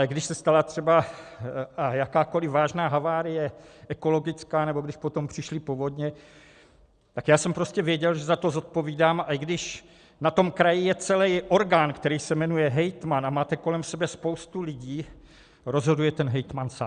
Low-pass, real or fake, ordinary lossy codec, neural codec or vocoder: 9.9 kHz; real; Opus, 64 kbps; none